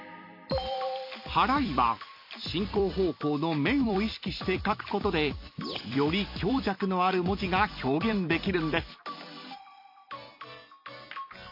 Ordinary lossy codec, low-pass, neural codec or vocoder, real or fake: MP3, 32 kbps; 5.4 kHz; vocoder, 44.1 kHz, 128 mel bands every 256 samples, BigVGAN v2; fake